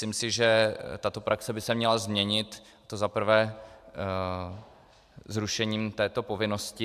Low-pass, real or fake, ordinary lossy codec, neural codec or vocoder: 14.4 kHz; real; AAC, 96 kbps; none